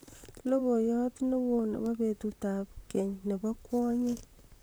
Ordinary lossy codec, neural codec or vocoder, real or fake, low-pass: none; vocoder, 44.1 kHz, 128 mel bands, Pupu-Vocoder; fake; none